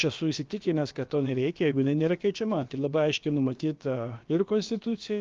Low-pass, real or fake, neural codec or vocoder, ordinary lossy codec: 7.2 kHz; fake; codec, 16 kHz, about 1 kbps, DyCAST, with the encoder's durations; Opus, 32 kbps